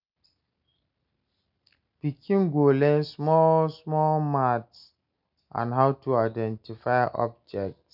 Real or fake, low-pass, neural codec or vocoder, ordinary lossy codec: real; 5.4 kHz; none; none